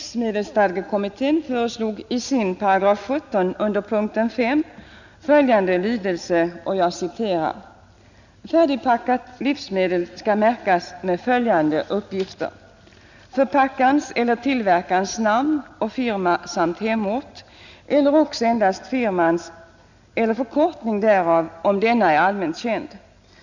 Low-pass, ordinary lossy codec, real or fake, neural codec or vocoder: 7.2 kHz; none; real; none